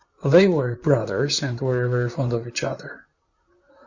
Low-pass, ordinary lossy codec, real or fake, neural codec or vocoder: 7.2 kHz; Opus, 64 kbps; fake; vocoder, 44.1 kHz, 128 mel bands, Pupu-Vocoder